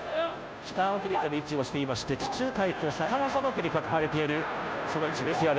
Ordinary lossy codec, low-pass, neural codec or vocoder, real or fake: none; none; codec, 16 kHz, 0.5 kbps, FunCodec, trained on Chinese and English, 25 frames a second; fake